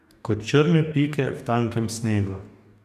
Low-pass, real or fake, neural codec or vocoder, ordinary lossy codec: 14.4 kHz; fake; codec, 44.1 kHz, 2.6 kbps, DAC; none